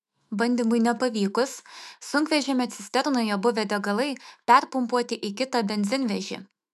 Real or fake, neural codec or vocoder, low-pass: fake; autoencoder, 48 kHz, 128 numbers a frame, DAC-VAE, trained on Japanese speech; 14.4 kHz